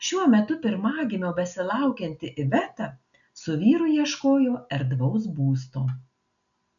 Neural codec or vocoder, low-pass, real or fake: none; 7.2 kHz; real